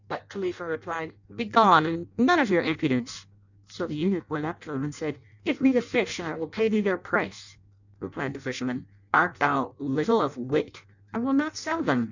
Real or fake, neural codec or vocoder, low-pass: fake; codec, 16 kHz in and 24 kHz out, 0.6 kbps, FireRedTTS-2 codec; 7.2 kHz